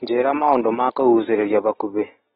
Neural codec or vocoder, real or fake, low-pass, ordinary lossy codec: none; real; 7.2 kHz; AAC, 16 kbps